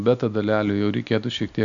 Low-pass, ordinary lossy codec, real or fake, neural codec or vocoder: 7.2 kHz; MP3, 64 kbps; real; none